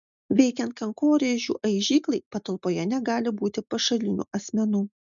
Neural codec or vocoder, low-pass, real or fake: none; 7.2 kHz; real